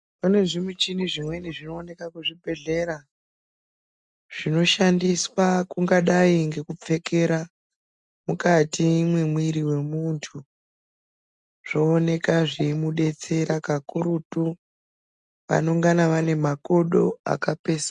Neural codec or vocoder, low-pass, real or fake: none; 10.8 kHz; real